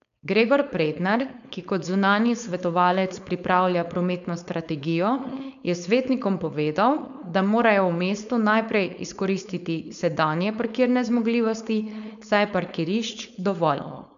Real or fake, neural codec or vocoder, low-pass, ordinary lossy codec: fake; codec, 16 kHz, 4.8 kbps, FACodec; 7.2 kHz; none